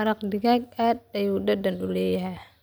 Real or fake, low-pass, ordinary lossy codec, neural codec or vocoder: real; none; none; none